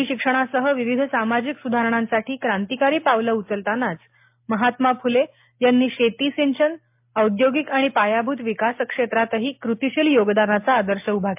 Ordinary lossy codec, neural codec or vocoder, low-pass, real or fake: MP3, 32 kbps; none; 3.6 kHz; real